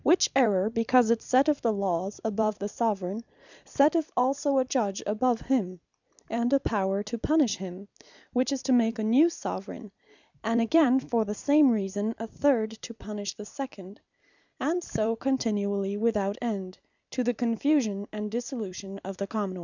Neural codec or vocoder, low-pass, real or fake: vocoder, 44.1 kHz, 128 mel bands every 256 samples, BigVGAN v2; 7.2 kHz; fake